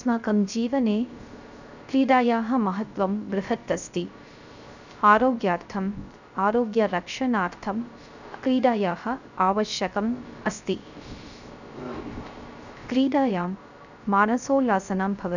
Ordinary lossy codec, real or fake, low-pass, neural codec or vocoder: none; fake; 7.2 kHz; codec, 16 kHz, 0.3 kbps, FocalCodec